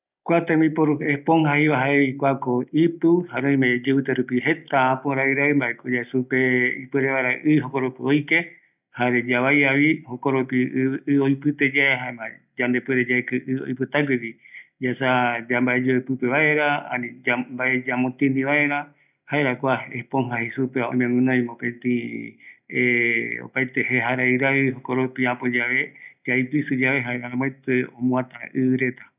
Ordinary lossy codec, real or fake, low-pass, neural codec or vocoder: none; real; 3.6 kHz; none